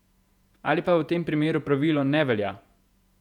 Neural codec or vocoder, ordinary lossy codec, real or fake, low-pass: vocoder, 48 kHz, 128 mel bands, Vocos; none; fake; 19.8 kHz